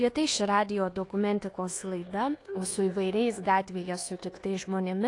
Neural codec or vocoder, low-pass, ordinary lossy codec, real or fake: codec, 24 kHz, 1.2 kbps, DualCodec; 10.8 kHz; AAC, 48 kbps; fake